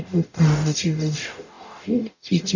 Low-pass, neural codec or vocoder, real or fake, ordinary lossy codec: 7.2 kHz; codec, 44.1 kHz, 0.9 kbps, DAC; fake; none